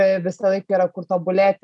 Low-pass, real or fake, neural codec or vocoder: 10.8 kHz; real; none